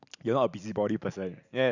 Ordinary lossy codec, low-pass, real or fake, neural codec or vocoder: none; 7.2 kHz; real; none